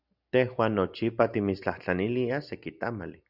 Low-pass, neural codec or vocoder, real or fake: 5.4 kHz; none; real